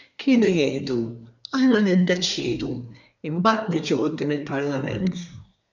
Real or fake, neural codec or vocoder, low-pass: fake; codec, 24 kHz, 1 kbps, SNAC; 7.2 kHz